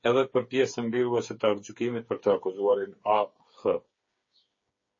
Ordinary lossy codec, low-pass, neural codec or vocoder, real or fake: MP3, 32 kbps; 7.2 kHz; codec, 16 kHz, 8 kbps, FreqCodec, smaller model; fake